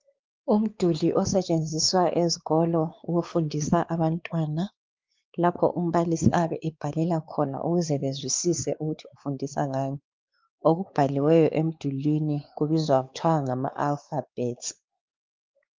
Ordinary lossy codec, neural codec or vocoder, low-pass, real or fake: Opus, 24 kbps; codec, 16 kHz, 4 kbps, X-Codec, WavLM features, trained on Multilingual LibriSpeech; 7.2 kHz; fake